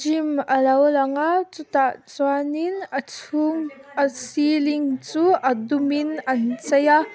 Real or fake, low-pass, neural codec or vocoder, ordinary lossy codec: real; none; none; none